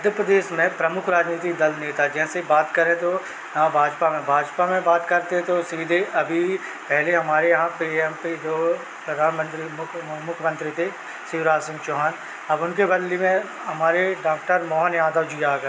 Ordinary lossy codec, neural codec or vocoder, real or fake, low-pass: none; none; real; none